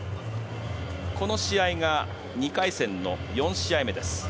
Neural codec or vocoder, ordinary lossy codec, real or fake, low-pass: none; none; real; none